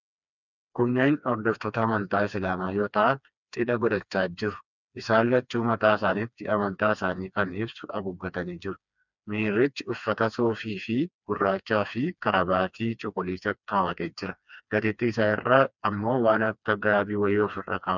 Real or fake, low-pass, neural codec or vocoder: fake; 7.2 kHz; codec, 16 kHz, 2 kbps, FreqCodec, smaller model